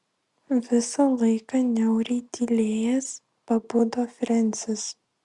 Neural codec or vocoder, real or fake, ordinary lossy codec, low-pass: none; real; Opus, 64 kbps; 10.8 kHz